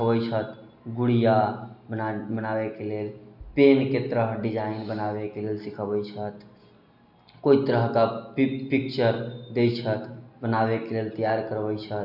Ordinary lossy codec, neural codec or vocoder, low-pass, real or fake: none; none; 5.4 kHz; real